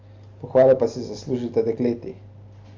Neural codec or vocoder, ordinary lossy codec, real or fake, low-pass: none; Opus, 32 kbps; real; 7.2 kHz